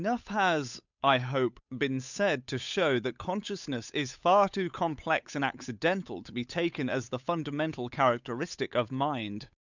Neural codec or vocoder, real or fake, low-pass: codec, 16 kHz, 8 kbps, FunCodec, trained on Chinese and English, 25 frames a second; fake; 7.2 kHz